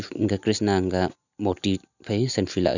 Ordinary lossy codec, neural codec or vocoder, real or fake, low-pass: none; none; real; 7.2 kHz